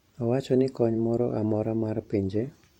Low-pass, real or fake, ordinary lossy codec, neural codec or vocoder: 19.8 kHz; fake; MP3, 64 kbps; vocoder, 44.1 kHz, 128 mel bands every 512 samples, BigVGAN v2